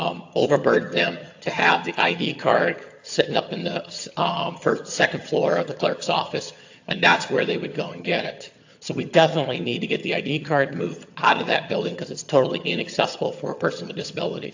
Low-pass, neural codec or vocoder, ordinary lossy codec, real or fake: 7.2 kHz; vocoder, 22.05 kHz, 80 mel bands, HiFi-GAN; AAC, 48 kbps; fake